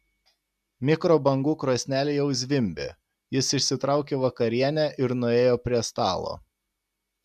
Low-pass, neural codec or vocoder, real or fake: 14.4 kHz; none; real